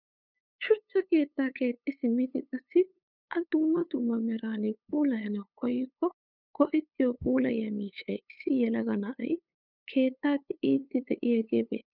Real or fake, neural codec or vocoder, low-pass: fake; codec, 16 kHz, 8 kbps, FunCodec, trained on LibriTTS, 25 frames a second; 5.4 kHz